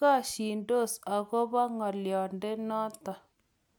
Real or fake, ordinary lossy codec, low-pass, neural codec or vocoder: real; none; none; none